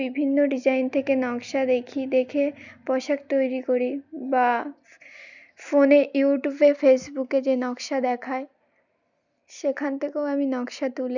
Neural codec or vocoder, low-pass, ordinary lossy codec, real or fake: none; 7.2 kHz; none; real